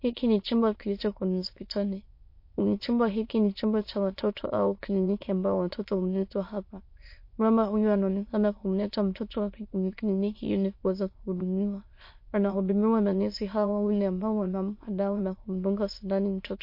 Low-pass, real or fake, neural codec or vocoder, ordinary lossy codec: 5.4 kHz; fake; autoencoder, 22.05 kHz, a latent of 192 numbers a frame, VITS, trained on many speakers; MP3, 32 kbps